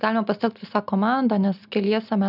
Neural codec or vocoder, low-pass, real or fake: none; 5.4 kHz; real